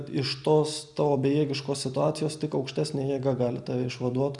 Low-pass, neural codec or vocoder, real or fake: 10.8 kHz; none; real